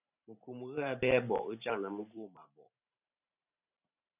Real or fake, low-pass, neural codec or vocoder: fake; 3.6 kHz; vocoder, 44.1 kHz, 128 mel bands every 512 samples, BigVGAN v2